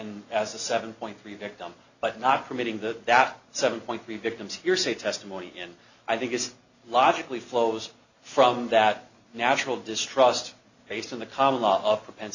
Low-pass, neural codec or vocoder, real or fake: 7.2 kHz; none; real